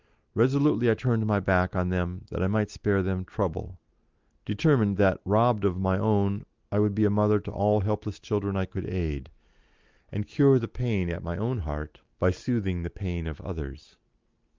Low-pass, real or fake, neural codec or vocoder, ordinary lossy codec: 7.2 kHz; real; none; Opus, 24 kbps